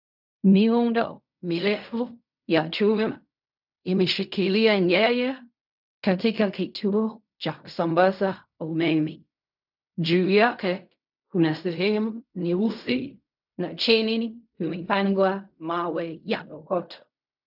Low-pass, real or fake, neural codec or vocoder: 5.4 kHz; fake; codec, 16 kHz in and 24 kHz out, 0.4 kbps, LongCat-Audio-Codec, fine tuned four codebook decoder